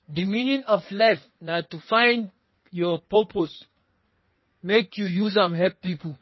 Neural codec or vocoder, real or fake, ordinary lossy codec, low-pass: codec, 16 kHz in and 24 kHz out, 1.1 kbps, FireRedTTS-2 codec; fake; MP3, 24 kbps; 7.2 kHz